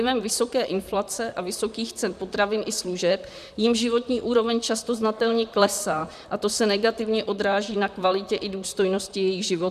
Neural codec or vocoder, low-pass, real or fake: vocoder, 44.1 kHz, 128 mel bands, Pupu-Vocoder; 14.4 kHz; fake